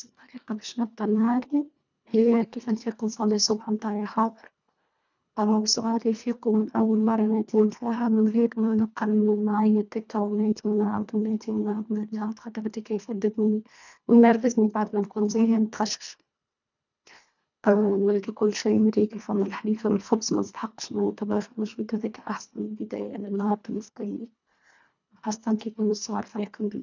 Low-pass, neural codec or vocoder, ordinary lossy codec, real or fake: 7.2 kHz; codec, 24 kHz, 1.5 kbps, HILCodec; none; fake